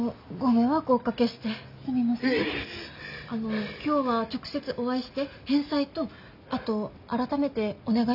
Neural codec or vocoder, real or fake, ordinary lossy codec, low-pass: none; real; none; 5.4 kHz